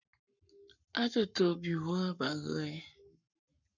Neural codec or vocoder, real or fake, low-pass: codec, 44.1 kHz, 7.8 kbps, Pupu-Codec; fake; 7.2 kHz